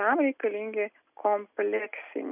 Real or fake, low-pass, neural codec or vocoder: real; 3.6 kHz; none